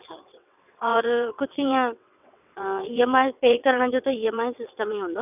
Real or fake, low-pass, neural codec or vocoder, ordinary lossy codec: fake; 3.6 kHz; vocoder, 22.05 kHz, 80 mel bands, WaveNeXt; none